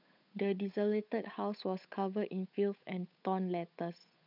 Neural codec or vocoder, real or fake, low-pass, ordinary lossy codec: codec, 16 kHz, 8 kbps, FunCodec, trained on Chinese and English, 25 frames a second; fake; 5.4 kHz; none